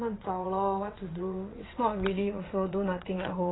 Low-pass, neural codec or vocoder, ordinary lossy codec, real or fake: 7.2 kHz; vocoder, 22.05 kHz, 80 mel bands, WaveNeXt; AAC, 16 kbps; fake